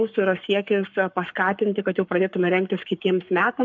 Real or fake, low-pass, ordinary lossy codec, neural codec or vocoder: fake; 7.2 kHz; MP3, 64 kbps; codec, 44.1 kHz, 7.8 kbps, Pupu-Codec